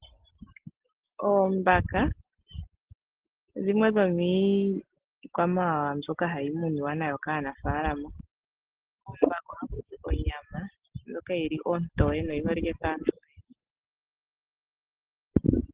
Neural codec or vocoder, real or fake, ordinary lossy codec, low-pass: none; real; Opus, 16 kbps; 3.6 kHz